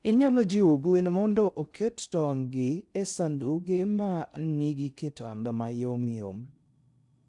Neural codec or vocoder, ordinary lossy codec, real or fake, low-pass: codec, 16 kHz in and 24 kHz out, 0.6 kbps, FocalCodec, streaming, 4096 codes; none; fake; 10.8 kHz